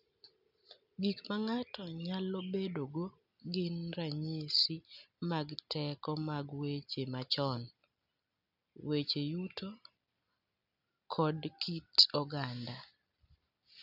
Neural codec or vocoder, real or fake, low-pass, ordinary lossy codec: none; real; 5.4 kHz; none